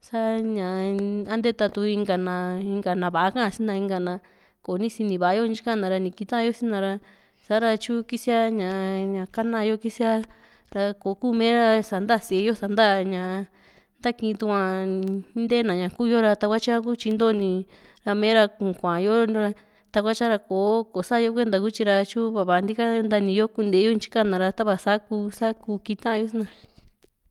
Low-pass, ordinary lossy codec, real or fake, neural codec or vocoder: 19.8 kHz; Opus, 32 kbps; real; none